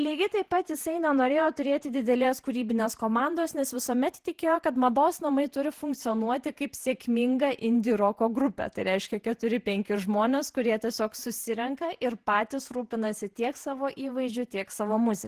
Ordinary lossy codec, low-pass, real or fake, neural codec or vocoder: Opus, 16 kbps; 14.4 kHz; fake; vocoder, 48 kHz, 128 mel bands, Vocos